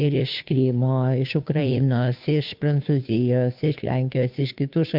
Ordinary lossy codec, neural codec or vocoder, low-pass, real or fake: MP3, 48 kbps; codec, 16 kHz, 2 kbps, FunCodec, trained on Chinese and English, 25 frames a second; 5.4 kHz; fake